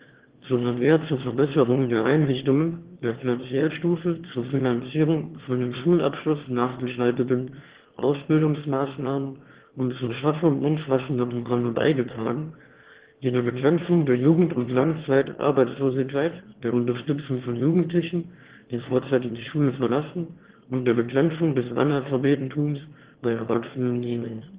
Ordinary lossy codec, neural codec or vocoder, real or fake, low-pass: Opus, 16 kbps; autoencoder, 22.05 kHz, a latent of 192 numbers a frame, VITS, trained on one speaker; fake; 3.6 kHz